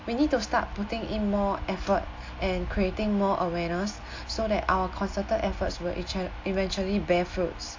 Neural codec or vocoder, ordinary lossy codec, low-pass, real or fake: none; AAC, 48 kbps; 7.2 kHz; real